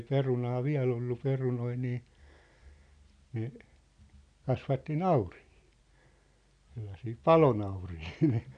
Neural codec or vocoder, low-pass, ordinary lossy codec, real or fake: none; 9.9 kHz; none; real